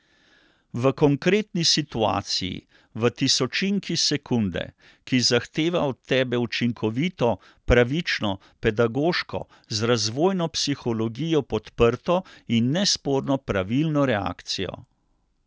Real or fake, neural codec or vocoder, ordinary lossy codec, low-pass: real; none; none; none